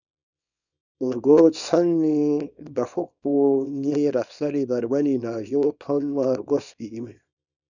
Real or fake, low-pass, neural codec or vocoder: fake; 7.2 kHz; codec, 24 kHz, 0.9 kbps, WavTokenizer, small release